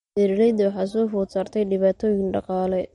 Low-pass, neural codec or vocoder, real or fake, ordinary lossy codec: 19.8 kHz; none; real; MP3, 48 kbps